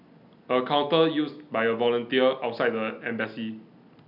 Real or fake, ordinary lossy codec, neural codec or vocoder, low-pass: real; none; none; 5.4 kHz